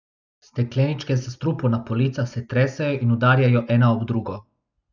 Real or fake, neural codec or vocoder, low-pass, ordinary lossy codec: real; none; 7.2 kHz; none